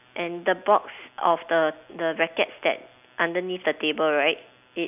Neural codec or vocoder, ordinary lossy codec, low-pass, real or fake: none; none; 3.6 kHz; real